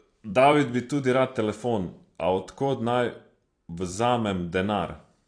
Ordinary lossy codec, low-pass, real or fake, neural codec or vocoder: AAC, 48 kbps; 9.9 kHz; fake; vocoder, 44.1 kHz, 128 mel bands every 256 samples, BigVGAN v2